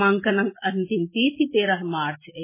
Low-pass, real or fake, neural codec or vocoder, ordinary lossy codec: 3.6 kHz; real; none; MP3, 16 kbps